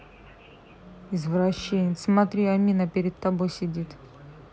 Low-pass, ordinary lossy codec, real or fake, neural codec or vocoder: none; none; real; none